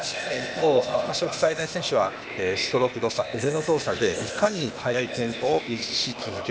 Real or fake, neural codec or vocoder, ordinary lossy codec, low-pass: fake; codec, 16 kHz, 0.8 kbps, ZipCodec; none; none